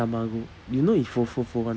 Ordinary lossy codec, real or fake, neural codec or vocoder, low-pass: none; real; none; none